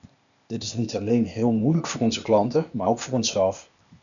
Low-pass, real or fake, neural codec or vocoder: 7.2 kHz; fake; codec, 16 kHz, 0.8 kbps, ZipCodec